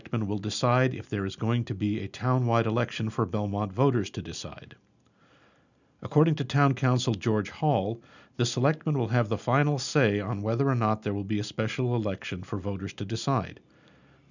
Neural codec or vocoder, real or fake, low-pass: none; real; 7.2 kHz